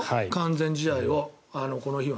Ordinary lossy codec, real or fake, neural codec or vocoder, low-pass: none; real; none; none